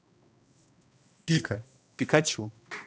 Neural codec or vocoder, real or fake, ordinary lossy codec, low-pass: codec, 16 kHz, 1 kbps, X-Codec, HuBERT features, trained on balanced general audio; fake; none; none